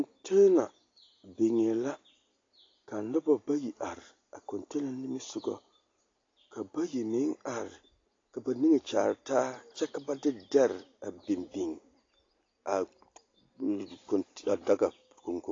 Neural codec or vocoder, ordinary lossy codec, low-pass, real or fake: none; AAC, 32 kbps; 7.2 kHz; real